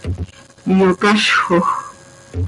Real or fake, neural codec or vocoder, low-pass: real; none; 10.8 kHz